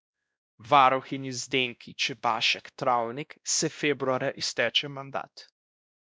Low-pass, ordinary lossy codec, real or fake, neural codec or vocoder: none; none; fake; codec, 16 kHz, 1 kbps, X-Codec, WavLM features, trained on Multilingual LibriSpeech